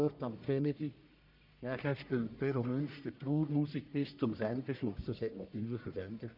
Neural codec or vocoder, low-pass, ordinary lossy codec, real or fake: codec, 44.1 kHz, 1.7 kbps, Pupu-Codec; 5.4 kHz; none; fake